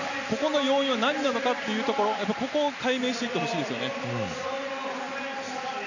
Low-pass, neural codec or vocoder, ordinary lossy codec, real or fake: 7.2 kHz; none; none; real